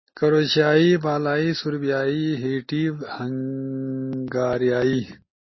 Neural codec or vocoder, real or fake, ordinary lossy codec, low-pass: none; real; MP3, 24 kbps; 7.2 kHz